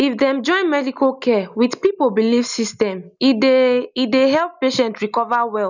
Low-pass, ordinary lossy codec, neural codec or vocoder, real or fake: 7.2 kHz; none; none; real